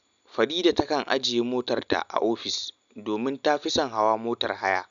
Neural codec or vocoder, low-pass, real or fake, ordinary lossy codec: none; 7.2 kHz; real; none